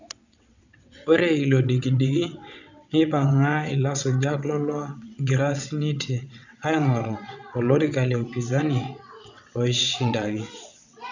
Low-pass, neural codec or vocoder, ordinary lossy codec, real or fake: 7.2 kHz; vocoder, 22.05 kHz, 80 mel bands, WaveNeXt; none; fake